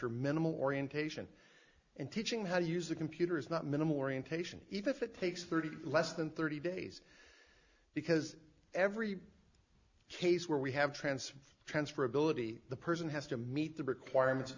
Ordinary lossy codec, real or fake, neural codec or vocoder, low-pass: Opus, 64 kbps; real; none; 7.2 kHz